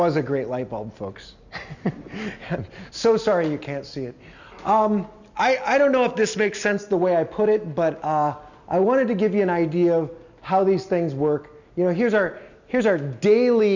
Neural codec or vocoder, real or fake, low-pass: none; real; 7.2 kHz